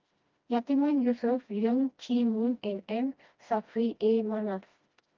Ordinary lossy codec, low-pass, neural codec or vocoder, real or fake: Opus, 32 kbps; 7.2 kHz; codec, 16 kHz, 1 kbps, FreqCodec, smaller model; fake